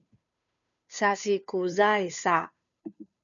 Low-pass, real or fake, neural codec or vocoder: 7.2 kHz; fake; codec, 16 kHz, 2 kbps, FunCodec, trained on Chinese and English, 25 frames a second